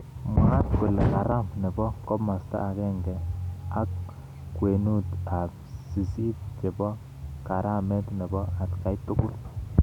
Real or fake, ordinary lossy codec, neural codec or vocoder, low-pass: real; none; none; 19.8 kHz